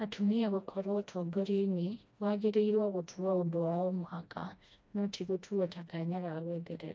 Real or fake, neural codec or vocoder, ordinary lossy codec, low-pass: fake; codec, 16 kHz, 1 kbps, FreqCodec, smaller model; none; none